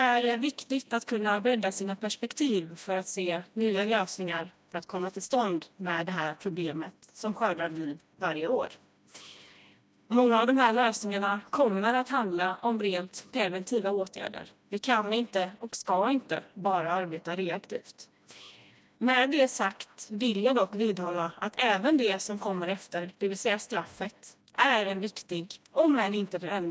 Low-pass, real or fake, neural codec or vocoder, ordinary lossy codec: none; fake; codec, 16 kHz, 1 kbps, FreqCodec, smaller model; none